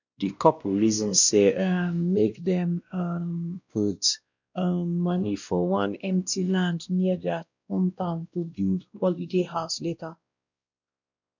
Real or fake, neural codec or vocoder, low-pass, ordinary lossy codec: fake; codec, 16 kHz, 1 kbps, X-Codec, WavLM features, trained on Multilingual LibriSpeech; 7.2 kHz; none